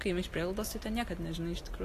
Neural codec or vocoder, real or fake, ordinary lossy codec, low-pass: none; real; AAC, 48 kbps; 14.4 kHz